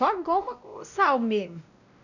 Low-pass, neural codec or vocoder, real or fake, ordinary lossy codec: 7.2 kHz; codec, 16 kHz, 1 kbps, X-Codec, WavLM features, trained on Multilingual LibriSpeech; fake; none